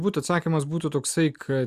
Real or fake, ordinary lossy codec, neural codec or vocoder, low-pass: real; Opus, 64 kbps; none; 14.4 kHz